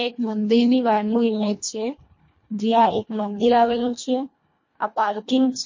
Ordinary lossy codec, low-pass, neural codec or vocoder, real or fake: MP3, 32 kbps; 7.2 kHz; codec, 24 kHz, 1.5 kbps, HILCodec; fake